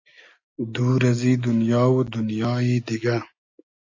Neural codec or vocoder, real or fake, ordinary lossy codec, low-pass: none; real; AAC, 48 kbps; 7.2 kHz